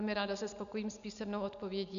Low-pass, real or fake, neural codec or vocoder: 7.2 kHz; real; none